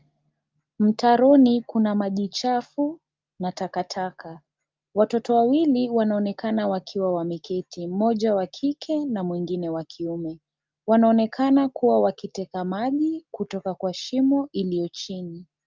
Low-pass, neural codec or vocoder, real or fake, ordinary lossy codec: 7.2 kHz; none; real; Opus, 24 kbps